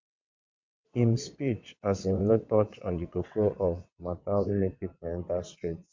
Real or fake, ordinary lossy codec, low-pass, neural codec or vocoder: fake; MP3, 48 kbps; 7.2 kHz; vocoder, 22.05 kHz, 80 mel bands, WaveNeXt